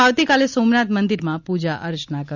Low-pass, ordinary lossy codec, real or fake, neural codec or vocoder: 7.2 kHz; none; real; none